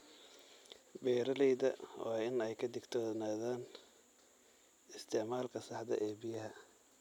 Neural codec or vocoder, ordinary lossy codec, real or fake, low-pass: none; none; real; 19.8 kHz